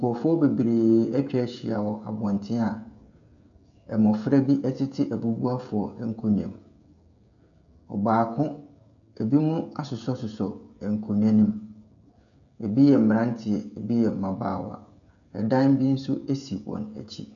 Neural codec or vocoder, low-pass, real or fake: codec, 16 kHz, 16 kbps, FreqCodec, smaller model; 7.2 kHz; fake